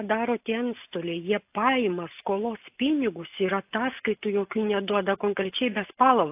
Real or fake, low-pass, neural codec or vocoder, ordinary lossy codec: real; 3.6 kHz; none; AAC, 32 kbps